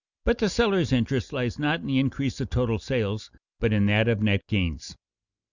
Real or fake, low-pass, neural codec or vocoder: real; 7.2 kHz; none